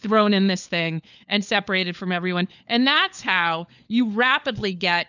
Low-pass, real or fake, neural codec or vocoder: 7.2 kHz; fake; codec, 16 kHz, 4 kbps, FunCodec, trained on LibriTTS, 50 frames a second